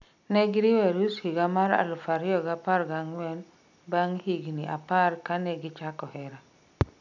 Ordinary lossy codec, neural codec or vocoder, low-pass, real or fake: none; none; 7.2 kHz; real